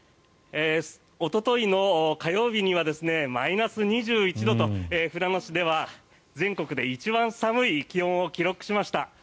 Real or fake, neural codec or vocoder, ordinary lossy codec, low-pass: real; none; none; none